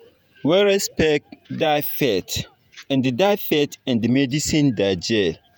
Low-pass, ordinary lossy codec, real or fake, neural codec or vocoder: none; none; fake; vocoder, 48 kHz, 128 mel bands, Vocos